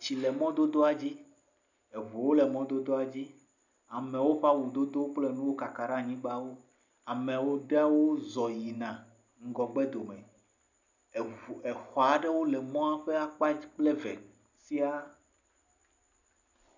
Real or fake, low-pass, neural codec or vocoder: real; 7.2 kHz; none